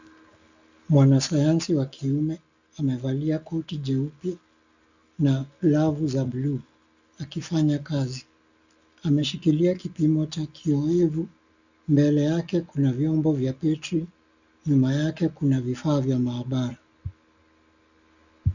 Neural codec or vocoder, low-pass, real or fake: none; 7.2 kHz; real